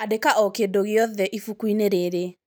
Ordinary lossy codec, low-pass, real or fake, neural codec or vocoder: none; none; real; none